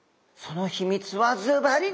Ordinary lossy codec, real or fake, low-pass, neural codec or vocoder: none; real; none; none